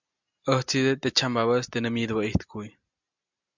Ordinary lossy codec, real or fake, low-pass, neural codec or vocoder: MP3, 64 kbps; real; 7.2 kHz; none